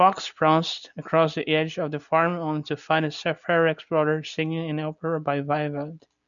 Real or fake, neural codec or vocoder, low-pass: real; none; 7.2 kHz